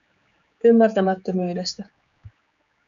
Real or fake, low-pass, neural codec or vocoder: fake; 7.2 kHz; codec, 16 kHz, 4 kbps, X-Codec, HuBERT features, trained on general audio